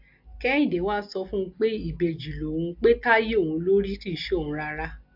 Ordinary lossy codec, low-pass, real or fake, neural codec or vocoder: AAC, 48 kbps; 5.4 kHz; real; none